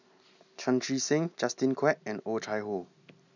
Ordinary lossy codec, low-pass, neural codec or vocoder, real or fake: none; 7.2 kHz; none; real